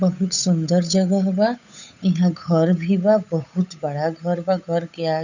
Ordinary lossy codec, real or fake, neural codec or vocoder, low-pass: none; fake; codec, 16 kHz, 8 kbps, FunCodec, trained on Chinese and English, 25 frames a second; 7.2 kHz